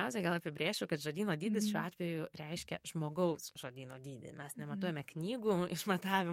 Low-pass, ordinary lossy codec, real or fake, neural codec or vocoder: 19.8 kHz; MP3, 64 kbps; fake; codec, 44.1 kHz, 7.8 kbps, DAC